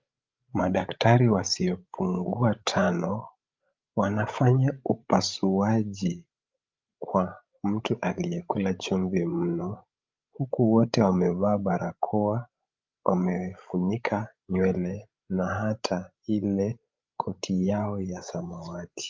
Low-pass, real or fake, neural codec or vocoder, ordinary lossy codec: 7.2 kHz; fake; codec, 16 kHz, 16 kbps, FreqCodec, larger model; Opus, 24 kbps